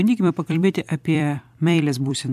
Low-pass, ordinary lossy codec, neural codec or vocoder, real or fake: 14.4 kHz; MP3, 96 kbps; vocoder, 44.1 kHz, 128 mel bands every 256 samples, BigVGAN v2; fake